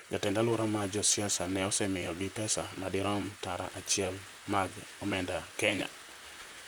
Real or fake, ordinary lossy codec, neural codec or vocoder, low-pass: fake; none; vocoder, 44.1 kHz, 128 mel bands, Pupu-Vocoder; none